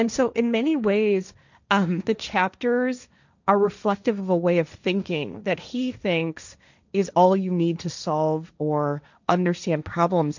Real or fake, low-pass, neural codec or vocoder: fake; 7.2 kHz; codec, 16 kHz, 1.1 kbps, Voila-Tokenizer